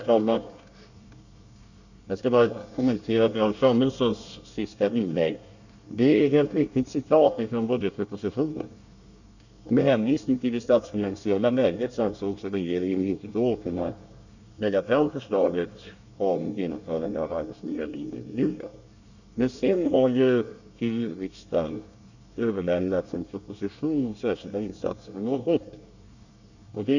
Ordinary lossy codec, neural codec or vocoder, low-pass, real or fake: none; codec, 24 kHz, 1 kbps, SNAC; 7.2 kHz; fake